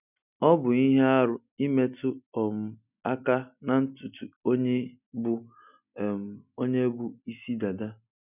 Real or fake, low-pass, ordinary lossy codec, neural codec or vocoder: real; 3.6 kHz; none; none